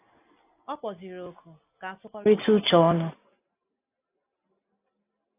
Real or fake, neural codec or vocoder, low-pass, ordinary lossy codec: real; none; 3.6 kHz; AAC, 32 kbps